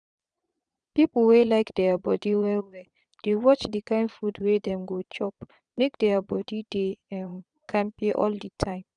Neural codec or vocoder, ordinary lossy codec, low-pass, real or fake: vocoder, 22.05 kHz, 80 mel bands, Vocos; none; 9.9 kHz; fake